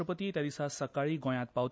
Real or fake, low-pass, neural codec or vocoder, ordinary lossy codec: real; none; none; none